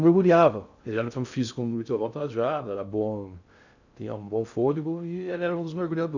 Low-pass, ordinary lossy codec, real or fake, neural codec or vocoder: 7.2 kHz; none; fake; codec, 16 kHz in and 24 kHz out, 0.6 kbps, FocalCodec, streaming, 2048 codes